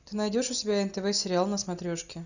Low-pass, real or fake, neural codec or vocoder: 7.2 kHz; real; none